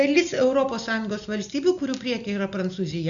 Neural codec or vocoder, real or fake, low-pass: none; real; 7.2 kHz